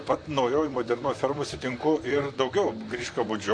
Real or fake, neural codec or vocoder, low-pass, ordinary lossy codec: fake; vocoder, 44.1 kHz, 128 mel bands, Pupu-Vocoder; 9.9 kHz; MP3, 48 kbps